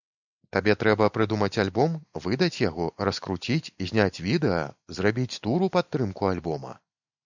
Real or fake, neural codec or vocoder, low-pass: real; none; 7.2 kHz